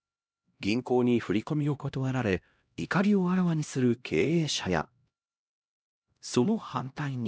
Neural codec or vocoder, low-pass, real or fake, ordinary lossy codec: codec, 16 kHz, 1 kbps, X-Codec, HuBERT features, trained on LibriSpeech; none; fake; none